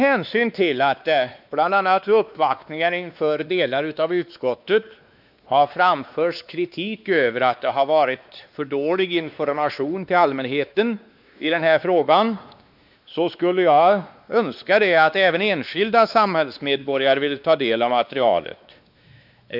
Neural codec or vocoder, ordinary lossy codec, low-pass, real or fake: codec, 16 kHz, 2 kbps, X-Codec, WavLM features, trained on Multilingual LibriSpeech; none; 5.4 kHz; fake